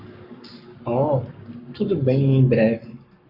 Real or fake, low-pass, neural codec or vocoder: fake; 5.4 kHz; codec, 44.1 kHz, 7.8 kbps, Pupu-Codec